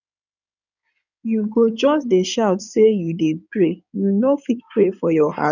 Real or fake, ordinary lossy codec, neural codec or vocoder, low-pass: fake; none; codec, 16 kHz in and 24 kHz out, 2.2 kbps, FireRedTTS-2 codec; 7.2 kHz